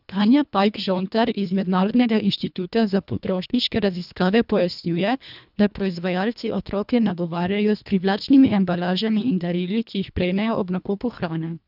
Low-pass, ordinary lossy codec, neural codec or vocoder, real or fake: 5.4 kHz; none; codec, 24 kHz, 1.5 kbps, HILCodec; fake